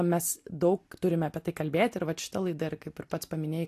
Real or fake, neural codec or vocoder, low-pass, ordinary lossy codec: real; none; 14.4 kHz; AAC, 64 kbps